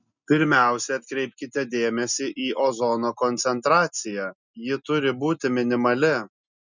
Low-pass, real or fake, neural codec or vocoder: 7.2 kHz; real; none